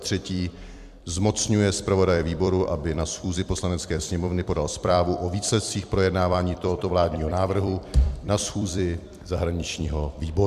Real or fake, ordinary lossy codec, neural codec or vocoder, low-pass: fake; MP3, 96 kbps; vocoder, 44.1 kHz, 128 mel bands every 256 samples, BigVGAN v2; 14.4 kHz